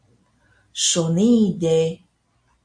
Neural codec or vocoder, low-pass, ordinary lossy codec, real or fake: none; 9.9 kHz; MP3, 48 kbps; real